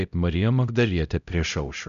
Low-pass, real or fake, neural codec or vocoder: 7.2 kHz; fake; codec, 16 kHz, 0.5 kbps, X-Codec, HuBERT features, trained on LibriSpeech